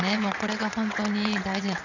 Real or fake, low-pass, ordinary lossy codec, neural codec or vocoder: fake; 7.2 kHz; none; vocoder, 22.05 kHz, 80 mel bands, WaveNeXt